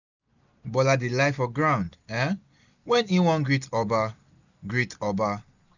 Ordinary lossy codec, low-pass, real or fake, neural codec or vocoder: none; 7.2 kHz; real; none